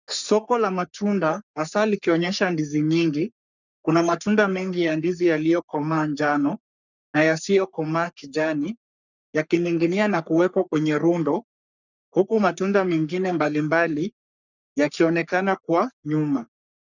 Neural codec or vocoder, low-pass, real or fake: codec, 44.1 kHz, 3.4 kbps, Pupu-Codec; 7.2 kHz; fake